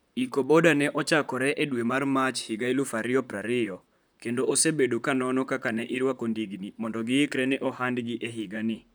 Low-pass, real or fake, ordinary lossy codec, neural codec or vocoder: none; fake; none; vocoder, 44.1 kHz, 128 mel bands, Pupu-Vocoder